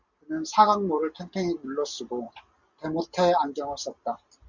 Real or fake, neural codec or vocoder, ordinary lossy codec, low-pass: real; none; Opus, 64 kbps; 7.2 kHz